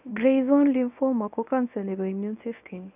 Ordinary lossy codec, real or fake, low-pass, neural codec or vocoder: none; fake; 3.6 kHz; codec, 24 kHz, 0.9 kbps, WavTokenizer, medium speech release version 1